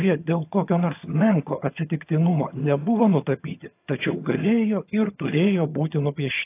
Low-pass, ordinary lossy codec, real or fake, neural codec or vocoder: 3.6 kHz; AAC, 24 kbps; fake; vocoder, 22.05 kHz, 80 mel bands, HiFi-GAN